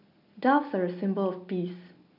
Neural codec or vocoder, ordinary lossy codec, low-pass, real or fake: none; none; 5.4 kHz; real